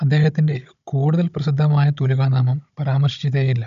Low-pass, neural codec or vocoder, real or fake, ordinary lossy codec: 7.2 kHz; codec, 16 kHz, 16 kbps, FunCodec, trained on Chinese and English, 50 frames a second; fake; none